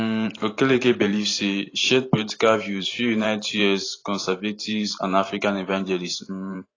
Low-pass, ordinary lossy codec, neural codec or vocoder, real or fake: 7.2 kHz; AAC, 32 kbps; vocoder, 44.1 kHz, 128 mel bands every 512 samples, BigVGAN v2; fake